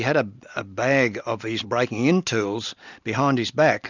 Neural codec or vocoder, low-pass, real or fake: none; 7.2 kHz; real